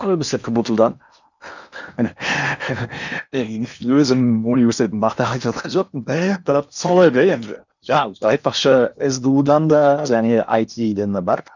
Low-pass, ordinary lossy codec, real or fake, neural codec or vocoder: 7.2 kHz; none; fake; codec, 16 kHz in and 24 kHz out, 0.8 kbps, FocalCodec, streaming, 65536 codes